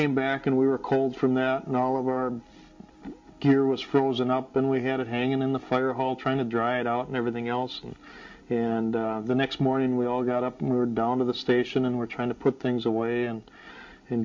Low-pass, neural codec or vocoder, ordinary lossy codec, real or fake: 7.2 kHz; none; MP3, 48 kbps; real